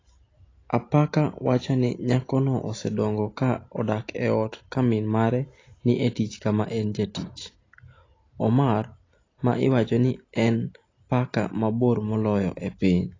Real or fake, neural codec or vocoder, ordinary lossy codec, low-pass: real; none; AAC, 32 kbps; 7.2 kHz